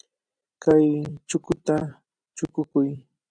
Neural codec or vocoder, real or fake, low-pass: vocoder, 44.1 kHz, 128 mel bands every 256 samples, BigVGAN v2; fake; 9.9 kHz